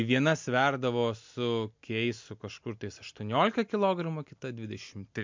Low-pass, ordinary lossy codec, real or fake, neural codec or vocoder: 7.2 kHz; MP3, 64 kbps; real; none